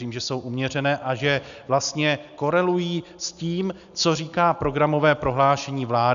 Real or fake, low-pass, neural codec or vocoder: real; 7.2 kHz; none